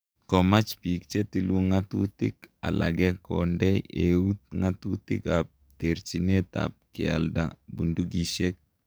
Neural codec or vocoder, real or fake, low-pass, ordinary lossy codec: codec, 44.1 kHz, 7.8 kbps, DAC; fake; none; none